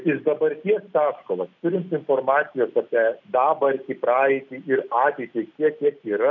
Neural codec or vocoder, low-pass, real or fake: none; 7.2 kHz; real